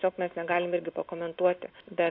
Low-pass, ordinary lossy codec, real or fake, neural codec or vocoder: 5.4 kHz; AAC, 32 kbps; real; none